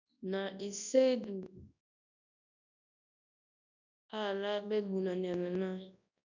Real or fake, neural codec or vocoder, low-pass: fake; codec, 24 kHz, 0.9 kbps, WavTokenizer, large speech release; 7.2 kHz